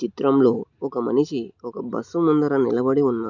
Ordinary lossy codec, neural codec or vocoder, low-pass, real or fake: none; none; 7.2 kHz; real